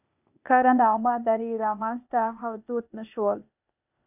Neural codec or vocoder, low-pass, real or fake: codec, 16 kHz, 0.8 kbps, ZipCodec; 3.6 kHz; fake